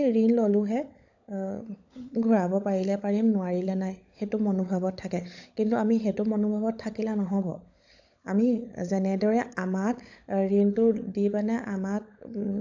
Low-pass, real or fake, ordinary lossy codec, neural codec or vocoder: 7.2 kHz; fake; AAC, 48 kbps; codec, 16 kHz, 16 kbps, FunCodec, trained on LibriTTS, 50 frames a second